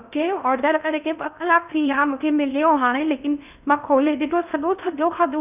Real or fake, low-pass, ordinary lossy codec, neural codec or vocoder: fake; 3.6 kHz; none; codec, 16 kHz in and 24 kHz out, 0.6 kbps, FocalCodec, streaming, 2048 codes